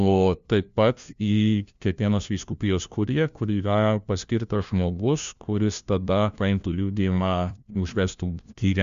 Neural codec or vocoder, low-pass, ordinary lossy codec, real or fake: codec, 16 kHz, 1 kbps, FunCodec, trained on LibriTTS, 50 frames a second; 7.2 kHz; Opus, 64 kbps; fake